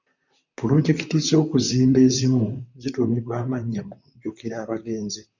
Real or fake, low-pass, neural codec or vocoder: fake; 7.2 kHz; vocoder, 44.1 kHz, 128 mel bands, Pupu-Vocoder